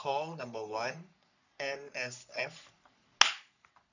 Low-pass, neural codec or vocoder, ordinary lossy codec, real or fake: 7.2 kHz; codec, 44.1 kHz, 3.4 kbps, Pupu-Codec; none; fake